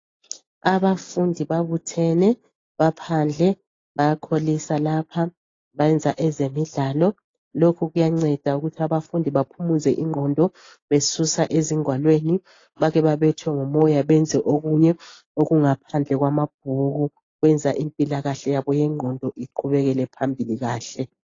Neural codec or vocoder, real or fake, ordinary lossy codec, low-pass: none; real; AAC, 32 kbps; 7.2 kHz